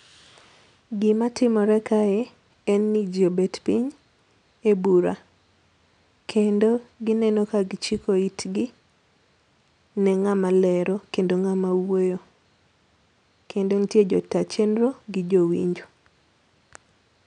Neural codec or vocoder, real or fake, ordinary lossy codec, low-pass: none; real; none; 9.9 kHz